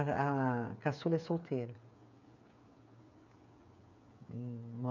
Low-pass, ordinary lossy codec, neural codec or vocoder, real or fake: 7.2 kHz; none; codec, 16 kHz, 8 kbps, FreqCodec, smaller model; fake